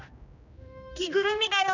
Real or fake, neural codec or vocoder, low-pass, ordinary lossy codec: fake; codec, 16 kHz, 1 kbps, X-Codec, HuBERT features, trained on general audio; 7.2 kHz; none